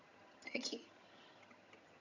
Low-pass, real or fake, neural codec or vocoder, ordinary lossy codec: 7.2 kHz; fake; vocoder, 22.05 kHz, 80 mel bands, HiFi-GAN; none